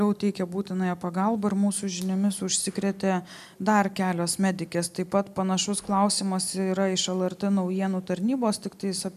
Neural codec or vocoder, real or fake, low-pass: none; real; 14.4 kHz